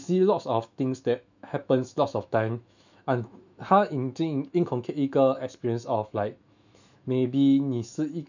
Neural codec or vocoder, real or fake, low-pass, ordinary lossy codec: autoencoder, 48 kHz, 128 numbers a frame, DAC-VAE, trained on Japanese speech; fake; 7.2 kHz; none